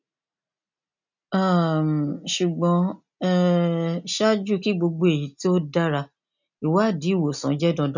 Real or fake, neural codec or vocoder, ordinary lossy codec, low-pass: real; none; none; 7.2 kHz